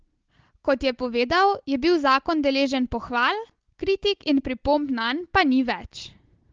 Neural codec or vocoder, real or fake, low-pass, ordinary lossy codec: none; real; 7.2 kHz; Opus, 16 kbps